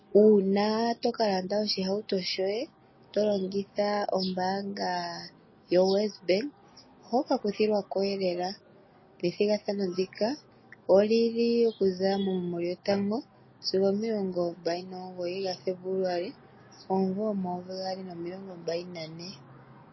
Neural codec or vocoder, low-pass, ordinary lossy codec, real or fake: none; 7.2 kHz; MP3, 24 kbps; real